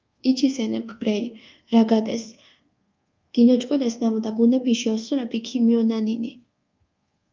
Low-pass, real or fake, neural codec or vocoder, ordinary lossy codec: 7.2 kHz; fake; codec, 24 kHz, 1.2 kbps, DualCodec; Opus, 24 kbps